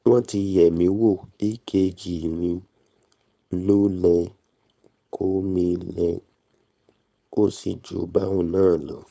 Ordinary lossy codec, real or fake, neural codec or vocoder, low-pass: none; fake; codec, 16 kHz, 4.8 kbps, FACodec; none